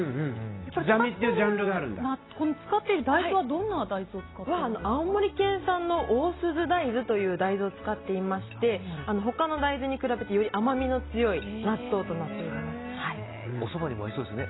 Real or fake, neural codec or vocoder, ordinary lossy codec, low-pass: real; none; AAC, 16 kbps; 7.2 kHz